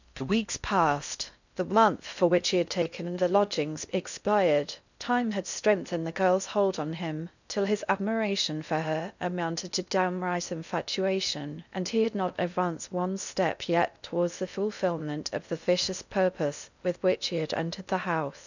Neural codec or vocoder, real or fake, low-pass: codec, 16 kHz in and 24 kHz out, 0.6 kbps, FocalCodec, streaming, 4096 codes; fake; 7.2 kHz